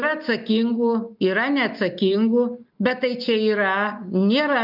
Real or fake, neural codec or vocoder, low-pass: real; none; 5.4 kHz